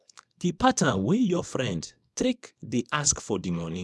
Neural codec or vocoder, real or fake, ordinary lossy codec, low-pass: codec, 24 kHz, 0.9 kbps, WavTokenizer, small release; fake; none; none